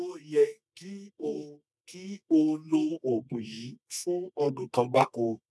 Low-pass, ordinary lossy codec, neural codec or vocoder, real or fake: none; none; codec, 24 kHz, 0.9 kbps, WavTokenizer, medium music audio release; fake